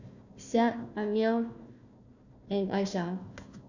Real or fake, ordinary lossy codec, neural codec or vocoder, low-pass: fake; none; codec, 16 kHz, 1 kbps, FunCodec, trained on Chinese and English, 50 frames a second; 7.2 kHz